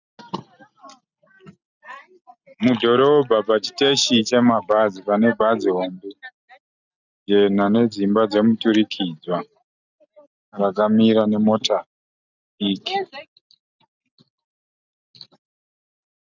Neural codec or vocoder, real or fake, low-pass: none; real; 7.2 kHz